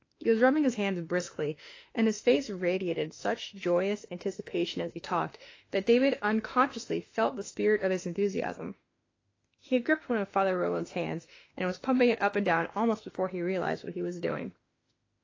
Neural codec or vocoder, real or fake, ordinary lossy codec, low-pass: autoencoder, 48 kHz, 32 numbers a frame, DAC-VAE, trained on Japanese speech; fake; AAC, 32 kbps; 7.2 kHz